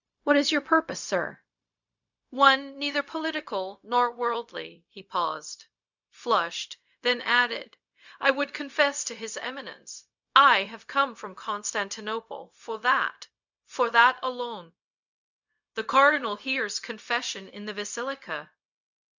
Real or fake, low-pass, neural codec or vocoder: fake; 7.2 kHz; codec, 16 kHz, 0.4 kbps, LongCat-Audio-Codec